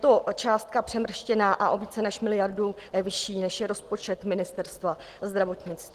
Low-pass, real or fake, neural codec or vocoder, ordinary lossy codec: 14.4 kHz; real; none; Opus, 16 kbps